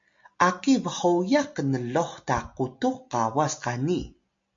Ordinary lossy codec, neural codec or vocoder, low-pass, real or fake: MP3, 48 kbps; none; 7.2 kHz; real